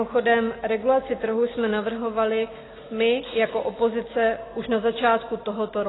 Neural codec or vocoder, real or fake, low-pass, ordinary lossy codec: none; real; 7.2 kHz; AAC, 16 kbps